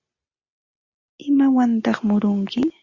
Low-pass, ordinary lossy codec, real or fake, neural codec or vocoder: 7.2 kHz; Opus, 64 kbps; real; none